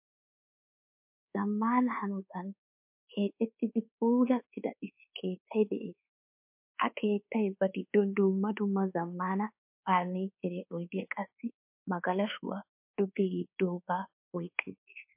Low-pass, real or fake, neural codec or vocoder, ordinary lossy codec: 3.6 kHz; fake; codec, 24 kHz, 1.2 kbps, DualCodec; MP3, 32 kbps